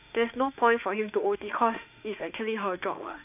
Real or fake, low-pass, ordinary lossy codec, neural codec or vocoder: fake; 3.6 kHz; none; autoencoder, 48 kHz, 32 numbers a frame, DAC-VAE, trained on Japanese speech